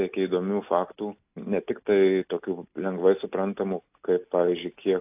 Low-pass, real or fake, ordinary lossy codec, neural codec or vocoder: 3.6 kHz; real; AAC, 32 kbps; none